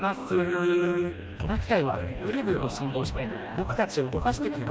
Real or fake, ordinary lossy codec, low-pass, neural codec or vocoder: fake; none; none; codec, 16 kHz, 1 kbps, FreqCodec, smaller model